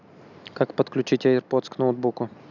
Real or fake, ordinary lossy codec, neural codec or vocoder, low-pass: real; none; none; 7.2 kHz